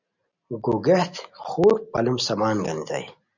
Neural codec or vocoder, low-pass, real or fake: none; 7.2 kHz; real